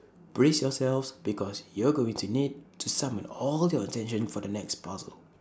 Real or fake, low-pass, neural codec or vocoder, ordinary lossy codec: real; none; none; none